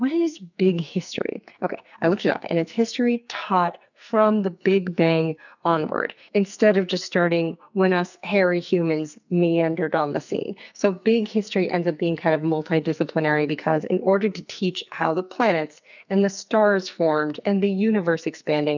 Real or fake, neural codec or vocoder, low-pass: fake; codec, 44.1 kHz, 2.6 kbps, SNAC; 7.2 kHz